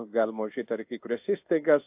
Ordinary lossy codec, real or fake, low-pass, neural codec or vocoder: AAC, 32 kbps; fake; 3.6 kHz; codec, 16 kHz in and 24 kHz out, 1 kbps, XY-Tokenizer